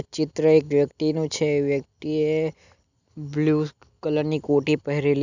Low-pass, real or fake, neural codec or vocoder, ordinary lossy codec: 7.2 kHz; real; none; none